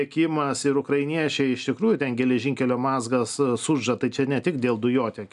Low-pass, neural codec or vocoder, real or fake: 10.8 kHz; none; real